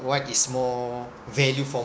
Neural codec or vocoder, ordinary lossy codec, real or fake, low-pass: codec, 16 kHz, 6 kbps, DAC; none; fake; none